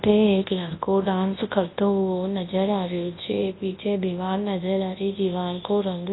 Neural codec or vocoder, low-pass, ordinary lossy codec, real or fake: codec, 24 kHz, 0.9 kbps, WavTokenizer, large speech release; 7.2 kHz; AAC, 16 kbps; fake